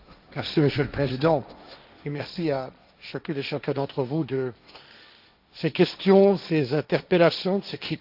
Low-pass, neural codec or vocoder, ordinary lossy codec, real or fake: 5.4 kHz; codec, 16 kHz, 1.1 kbps, Voila-Tokenizer; none; fake